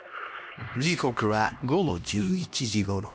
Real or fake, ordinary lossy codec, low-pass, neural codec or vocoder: fake; none; none; codec, 16 kHz, 1 kbps, X-Codec, HuBERT features, trained on LibriSpeech